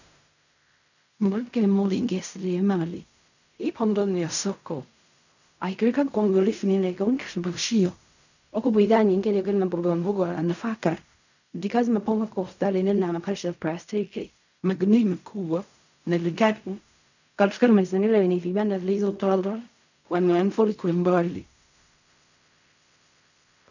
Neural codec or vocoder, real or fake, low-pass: codec, 16 kHz in and 24 kHz out, 0.4 kbps, LongCat-Audio-Codec, fine tuned four codebook decoder; fake; 7.2 kHz